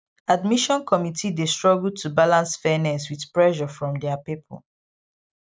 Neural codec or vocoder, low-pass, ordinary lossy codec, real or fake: none; none; none; real